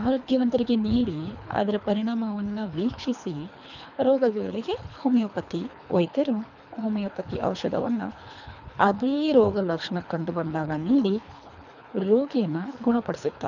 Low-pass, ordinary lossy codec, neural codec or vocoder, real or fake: 7.2 kHz; none; codec, 24 kHz, 3 kbps, HILCodec; fake